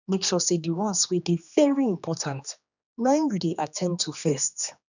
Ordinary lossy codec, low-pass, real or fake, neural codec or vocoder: none; 7.2 kHz; fake; codec, 16 kHz, 2 kbps, X-Codec, HuBERT features, trained on general audio